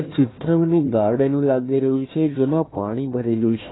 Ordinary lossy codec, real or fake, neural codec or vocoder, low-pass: AAC, 16 kbps; fake; codec, 16 kHz, 1 kbps, FunCodec, trained on Chinese and English, 50 frames a second; 7.2 kHz